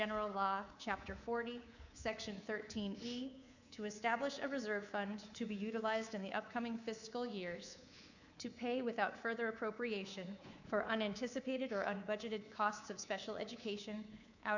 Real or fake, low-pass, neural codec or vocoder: fake; 7.2 kHz; codec, 24 kHz, 3.1 kbps, DualCodec